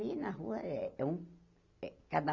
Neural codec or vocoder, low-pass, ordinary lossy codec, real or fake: none; 7.2 kHz; none; real